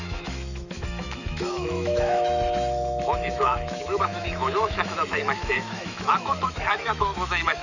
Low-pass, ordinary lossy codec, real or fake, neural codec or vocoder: 7.2 kHz; none; fake; autoencoder, 48 kHz, 128 numbers a frame, DAC-VAE, trained on Japanese speech